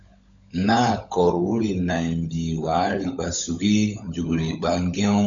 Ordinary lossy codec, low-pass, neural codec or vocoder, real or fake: MP3, 64 kbps; 7.2 kHz; codec, 16 kHz, 16 kbps, FunCodec, trained on LibriTTS, 50 frames a second; fake